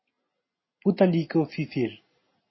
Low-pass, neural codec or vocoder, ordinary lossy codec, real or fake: 7.2 kHz; none; MP3, 24 kbps; real